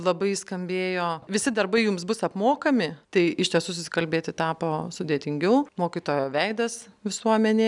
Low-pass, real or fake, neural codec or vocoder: 10.8 kHz; real; none